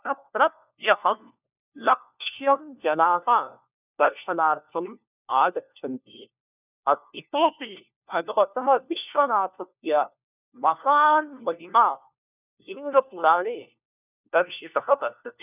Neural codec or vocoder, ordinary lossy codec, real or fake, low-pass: codec, 16 kHz, 1 kbps, FunCodec, trained on LibriTTS, 50 frames a second; none; fake; 3.6 kHz